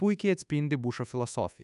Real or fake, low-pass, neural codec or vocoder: fake; 10.8 kHz; codec, 24 kHz, 1.2 kbps, DualCodec